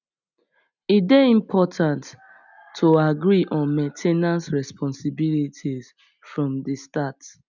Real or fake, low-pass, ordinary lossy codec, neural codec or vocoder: real; 7.2 kHz; none; none